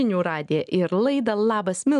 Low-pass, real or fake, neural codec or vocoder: 10.8 kHz; real; none